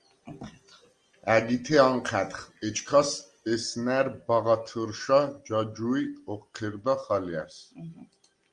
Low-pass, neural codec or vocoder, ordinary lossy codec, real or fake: 9.9 kHz; none; Opus, 24 kbps; real